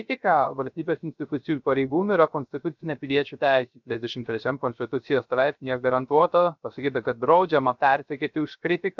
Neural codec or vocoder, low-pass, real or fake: codec, 16 kHz, 0.3 kbps, FocalCodec; 7.2 kHz; fake